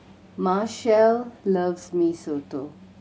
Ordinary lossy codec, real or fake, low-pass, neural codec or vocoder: none; real; none; none